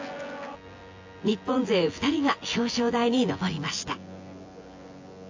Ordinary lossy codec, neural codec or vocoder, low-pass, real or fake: AAC, 48 kbps; vocoder, 24 kHz, 100 mel bands, Vocos; 7.2 kHz; fake